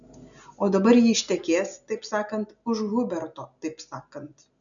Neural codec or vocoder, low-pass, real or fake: none; 7.2 kHz; real